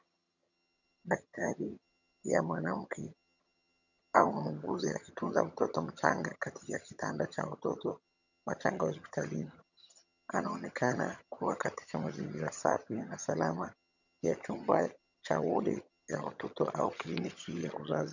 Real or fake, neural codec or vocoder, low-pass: fake; vocoder, 22.05 kHz, 80 mel bands, HiFi-GAN; 7.2 kHz